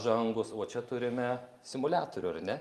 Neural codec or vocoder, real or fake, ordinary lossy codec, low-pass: none; real; Opus, 32 kbps; 14.4 kHz